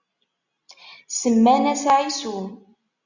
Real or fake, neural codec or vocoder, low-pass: real; none; 7.2 kHz